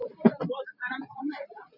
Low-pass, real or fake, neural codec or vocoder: 5.4 kHz; real; none